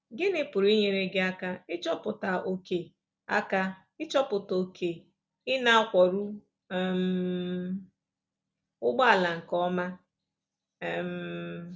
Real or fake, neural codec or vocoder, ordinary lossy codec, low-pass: real; none; none; none